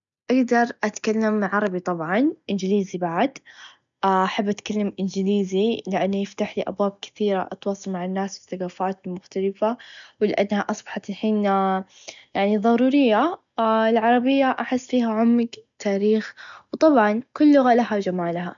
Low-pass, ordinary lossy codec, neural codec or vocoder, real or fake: 7.2 kHz; MP3, 96 kbps; none; real